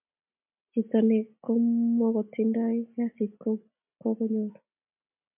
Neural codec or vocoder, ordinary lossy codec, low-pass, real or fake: none; MP3, 32 kbps; 3.6 kHz; real